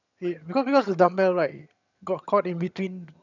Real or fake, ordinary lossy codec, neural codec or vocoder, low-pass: fake; none; vocoder, 22.05 kHz, 80 mel bands, HiFi-GAN; 7.2 kHz